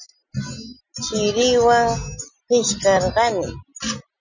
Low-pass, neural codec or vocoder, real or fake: 7.2 kHz; none; real